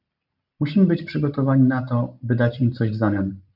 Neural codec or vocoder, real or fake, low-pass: none; real; 5.4 kHz